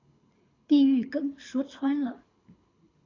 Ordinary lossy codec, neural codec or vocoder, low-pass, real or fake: AAC, 32 kbps; codec, 24 kHz, 6 kbps, HILCodec; 7.2 kHz; fake